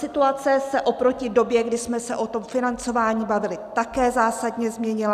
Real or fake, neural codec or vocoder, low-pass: real; none; 14.4 kHz